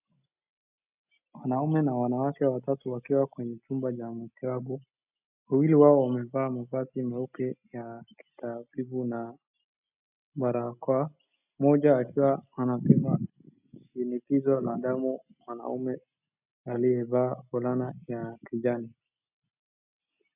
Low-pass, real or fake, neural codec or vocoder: 3.6 kHz; real; none